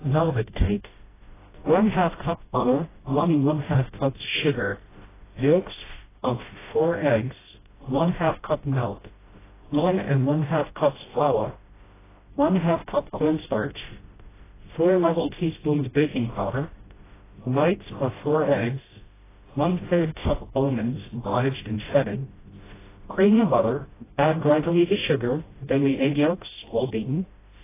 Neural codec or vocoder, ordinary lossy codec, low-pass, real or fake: codec, 16 kHz, 0.5 kbps, FreqCodec, smaller model; AAC, 16 kbps; 3.6 kHz; fake